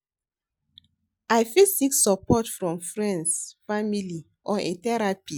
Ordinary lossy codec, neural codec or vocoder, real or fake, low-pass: none; none; real; none